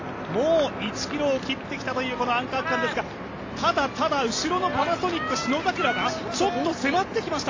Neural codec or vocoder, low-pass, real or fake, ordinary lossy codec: none; 7.2 kHz; real; AAC, 32 kbps